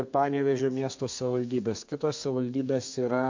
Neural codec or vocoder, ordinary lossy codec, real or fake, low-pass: codec, 32 kHz, 1.9 kbps, SNAC; MP3, 48 kbps; fake; 7.2 kHz